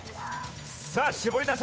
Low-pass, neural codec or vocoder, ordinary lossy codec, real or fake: none; codec, 16 kHz, 8 kbps, FunCodec, trained on Chinese and English, 25 frames a second; none; fake